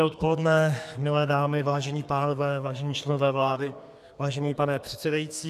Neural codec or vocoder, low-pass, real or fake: codec, 32 kHz, 1.9 kbps, SNAC; 14.4 kHz; fake